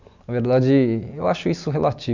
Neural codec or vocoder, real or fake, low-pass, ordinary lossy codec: none; real; 7.2 kHz; none